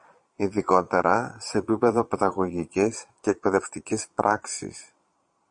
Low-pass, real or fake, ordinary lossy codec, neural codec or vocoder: 9.9 kHz; fake; MP3, 48 kbps; vocoder, 22.05 kHz, 80 mel bands, Vocos